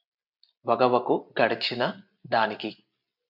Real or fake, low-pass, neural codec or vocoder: real; 5.4 kHz; none